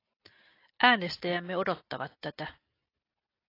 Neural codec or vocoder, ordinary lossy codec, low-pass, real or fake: none; AAC, 32 kbps; 5.4 kHz; real